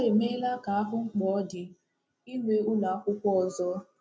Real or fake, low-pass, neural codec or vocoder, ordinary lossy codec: real; none; none; none